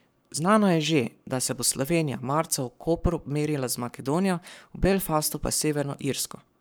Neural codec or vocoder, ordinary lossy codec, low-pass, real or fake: codec, 44.1 kHz, 7.8 kbps, Pupu-Codec; none; none; fake